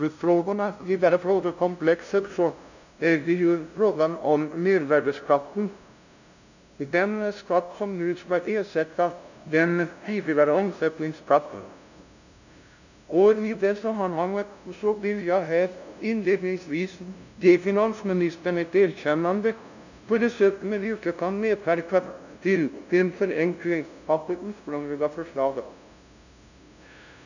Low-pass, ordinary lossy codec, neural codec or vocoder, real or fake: 7.2 kHz; none; codec, 16 kHz, 0.5 kbps, FunCodec, trained on LibriTTS, 25 frames a second; fake